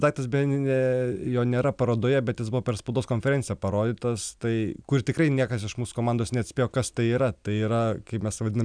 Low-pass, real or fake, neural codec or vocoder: 9.9 kHz; real; none